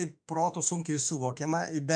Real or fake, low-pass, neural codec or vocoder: fake; 9.9 kHz; autoencoder, 48 kHz, 32 numbers a frame, DAC-VAE, trained on Japanese speech